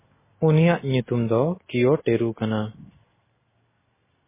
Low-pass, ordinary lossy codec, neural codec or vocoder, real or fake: 3.6 kHz; MP3, 16 kbps; none; real